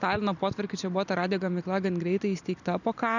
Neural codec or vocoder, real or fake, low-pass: none; real; 7.2 kHz